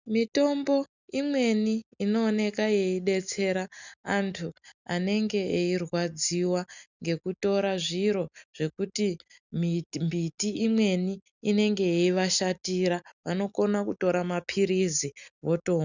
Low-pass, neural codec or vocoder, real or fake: 7.2 kHz; none; real